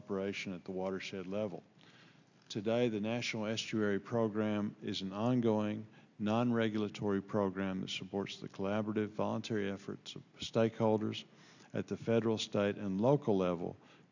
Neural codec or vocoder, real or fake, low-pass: none; real; 7.2 kHz